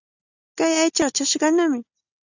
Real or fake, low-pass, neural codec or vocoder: real; 7.2 kHz; none